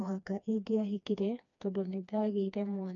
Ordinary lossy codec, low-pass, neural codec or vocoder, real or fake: none; 7.2 kHz; codec, 16 kHz, 2 kbps, FreqCodec, smaller model; fake